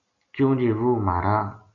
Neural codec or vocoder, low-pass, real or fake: none; 7.2 kHz; real